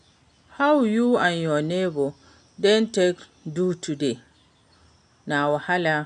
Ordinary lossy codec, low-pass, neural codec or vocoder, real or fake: MP3, 96 kbps; 9.9 kHz; none; real